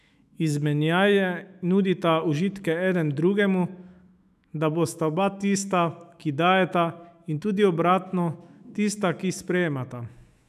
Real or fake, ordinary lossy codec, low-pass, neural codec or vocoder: fake; none; 14.4 kHz; autoencoder, 48 kHz, 128 numbers a frame, DAC-VAE, trained on Japanese speech